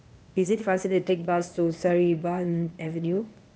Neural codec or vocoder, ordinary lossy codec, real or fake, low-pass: codec, 16 kHz, 0.8 kbps, ZipCodec; none; fake; none